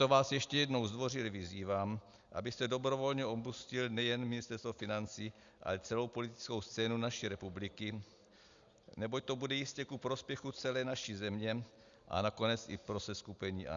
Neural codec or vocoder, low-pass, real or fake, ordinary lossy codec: none; 7.2 kHz; real; Opus, 64 kbps